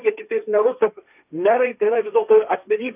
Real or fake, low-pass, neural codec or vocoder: fake; 3.6 kHz; codec, 16 kHz, 1.1 kbps, Voila-Tokenizer